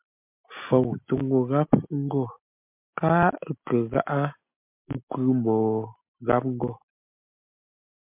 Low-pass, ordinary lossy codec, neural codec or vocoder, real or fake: 3.6 kHz; MP3, 32 kbps; none; real